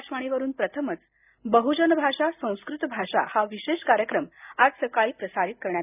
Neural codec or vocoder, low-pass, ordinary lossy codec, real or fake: none; 3.6 kHz; none; real